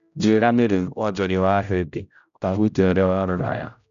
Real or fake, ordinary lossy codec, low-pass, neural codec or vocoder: fake; none; 7.2 kHz; codec, 16 kHz, 0.5 kbps, X-Codec, HuBERT features, trained on general audio